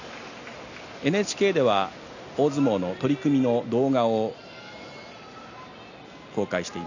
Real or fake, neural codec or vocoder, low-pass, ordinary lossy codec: real; none; 7.2 kHz; none